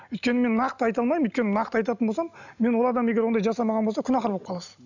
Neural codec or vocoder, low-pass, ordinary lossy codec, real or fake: none; 7.2 kHz; none; real